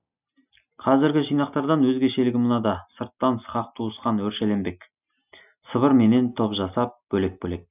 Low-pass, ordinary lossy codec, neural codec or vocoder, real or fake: 3.6 kHz; none; none; real